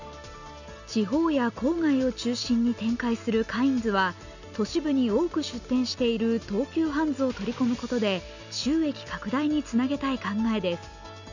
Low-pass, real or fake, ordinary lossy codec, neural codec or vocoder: 7.2 kHz; real; none; none